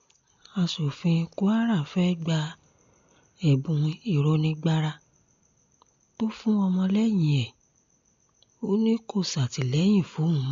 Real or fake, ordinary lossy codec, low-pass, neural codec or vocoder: real; MP3, 48 kbps; 7.2 kHz; none